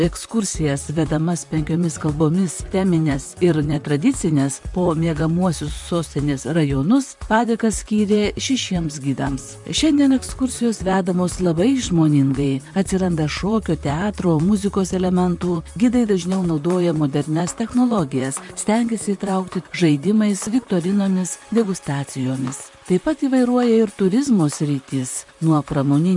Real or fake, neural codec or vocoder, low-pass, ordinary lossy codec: fake; vocoder, 44.1 kHz, 128 mel bands, Pupu-Vocoder; 10.8 kHz; MP3, 64 kbps